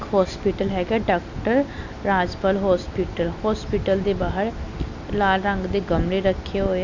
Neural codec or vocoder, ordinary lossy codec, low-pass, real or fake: none; none; 7.2 kHz; real